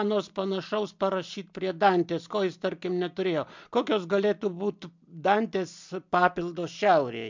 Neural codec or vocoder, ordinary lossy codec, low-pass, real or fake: none; MP3, 64 kbps; 7.2 kHz; real